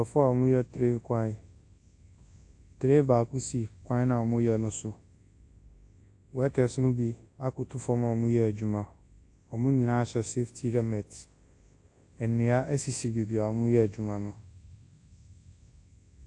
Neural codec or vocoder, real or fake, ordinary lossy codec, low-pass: codec, 24 kHz, 0.9 kbps, WavTokenizer, large speech release; fake; AAC, 48 kbps; 10.8 kHz